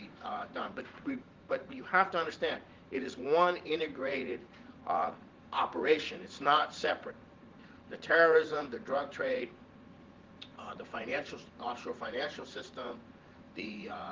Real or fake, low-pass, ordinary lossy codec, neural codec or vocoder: fake; 7.2 kHz; Opus, 16 kbps; vocoder, 44.1 kHz, 80 mel bands, Vocos